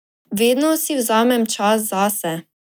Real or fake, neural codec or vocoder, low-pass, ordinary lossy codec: real; none; none; none